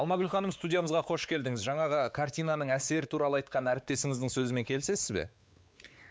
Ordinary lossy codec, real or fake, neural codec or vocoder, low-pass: none; fake; codec, 16 kHz, 4 kbps, X-Codec, WavLM features, trained on Multilingual LibriSpeech; none